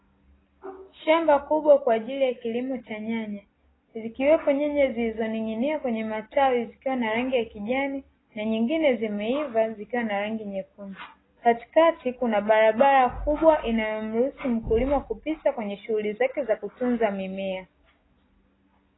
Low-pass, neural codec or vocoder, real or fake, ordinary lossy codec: 7.2 kHz; none; real; AAC, 16 kbps